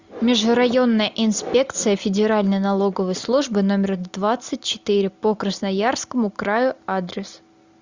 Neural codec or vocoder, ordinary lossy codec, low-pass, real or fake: none; Opus, 64 kbps; 7.2 kHz; real